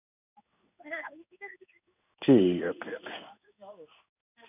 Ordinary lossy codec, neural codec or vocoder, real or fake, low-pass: none; codec, 16 kHz in and 24 kHz out, 1 kbps, XY-Tokenizer; fake; 3.6 kHz